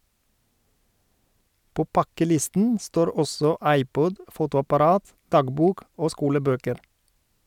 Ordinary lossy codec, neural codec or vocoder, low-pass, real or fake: none; none; 19.8 kHz; real